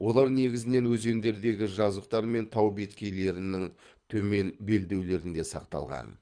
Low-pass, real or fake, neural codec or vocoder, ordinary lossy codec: 9.9 kHz; fake; codec, 24 kHz, 3 kbps, HILCodec; Opus, 64 kbps